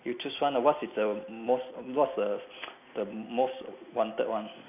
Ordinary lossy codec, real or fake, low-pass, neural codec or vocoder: none; real; 3.6 kHz; none